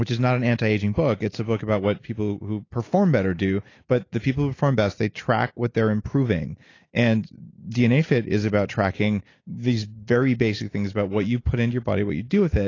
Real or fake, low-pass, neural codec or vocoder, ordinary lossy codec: real; 7.2 kHz; none; AAC, 32 kbps